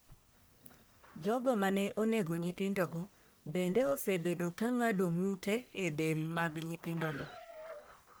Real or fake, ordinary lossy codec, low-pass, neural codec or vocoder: fake; none; none; codec, 44.1 kHz, 1.7 kbps, Pupu-Codec